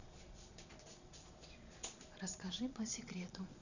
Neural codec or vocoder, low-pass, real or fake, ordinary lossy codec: vocoder, 22.05 kHz, 80 mel bands, Vocos; 7.2 kHz; fake; none